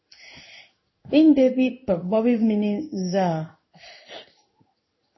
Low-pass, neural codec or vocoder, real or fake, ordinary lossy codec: 7.2 kHz; codec, 16 kHz in and 24 kHz out, 1 kbps, XY-Tokenizer; fake; MP3, 24 kbps